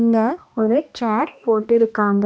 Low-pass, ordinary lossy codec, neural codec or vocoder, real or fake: none; none; codec, 16 kHz, 1 kbps, X-Codec, HuBERT features, trained on balanced general audio; fake